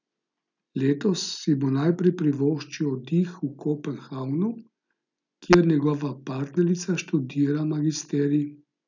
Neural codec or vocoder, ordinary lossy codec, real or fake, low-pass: none; none; real; 7.2 kHz